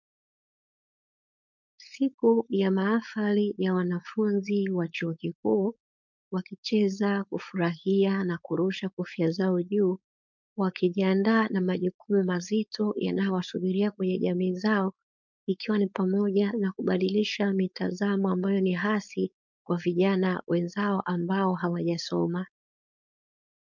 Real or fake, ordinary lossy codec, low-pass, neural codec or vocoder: fake; MP3, 64 kbps; 7.2 kHz; codec, 16 kHz, 4.8 kbps, FACodec